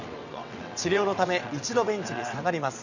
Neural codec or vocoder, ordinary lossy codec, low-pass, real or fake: vocoder, 22.05 kHz, 80 mel bands, WaveNeXt; none; 7.2 kHz; fake